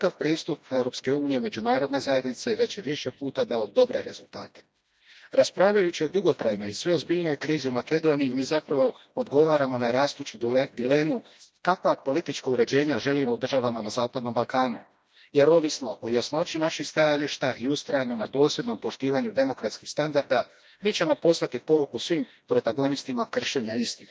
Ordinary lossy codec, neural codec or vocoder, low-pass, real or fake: none; codec, 16 kHz, 1 kbps, FreqCodec, smaller model; none; fake